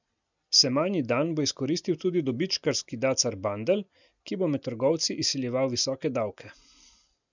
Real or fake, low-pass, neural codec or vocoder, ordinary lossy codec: real; 7.2 kHz; none; none